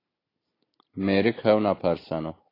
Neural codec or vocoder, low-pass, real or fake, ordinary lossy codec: none; 5.4 kHz; real; AAC, 24 kbps